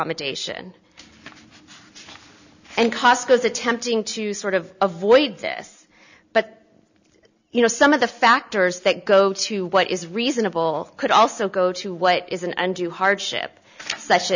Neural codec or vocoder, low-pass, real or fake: none; 7.2 kHz; real